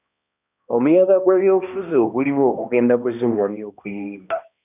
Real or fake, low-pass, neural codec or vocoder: fake; 3.6 kHz; codec, 16 kHz, 1 kbps, X-Codec, HuBERT features, trained on balanced general audio